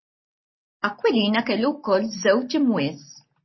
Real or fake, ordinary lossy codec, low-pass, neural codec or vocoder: real; MP3, 24 kbps; 7.2 kHz; none